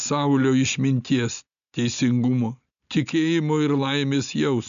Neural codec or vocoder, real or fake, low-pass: none; real; 7.2 kHz